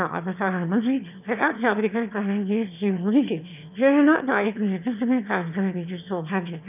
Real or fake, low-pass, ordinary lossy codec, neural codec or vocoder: fake; 3.6 kHz; none; autoencoder, 22.05 kHz, a latent of 192 numbers a frame, VITS, trained on one speaker